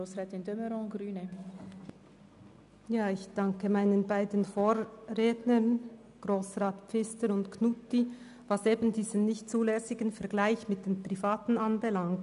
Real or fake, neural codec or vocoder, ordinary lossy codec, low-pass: real; none; none; 10.8 kHz